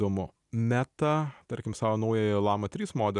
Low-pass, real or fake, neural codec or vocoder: 10.8 kHz; real; none